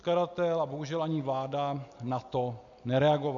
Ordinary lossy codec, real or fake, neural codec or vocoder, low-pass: MP3, 96 kbps; real; none; 7.2 kHz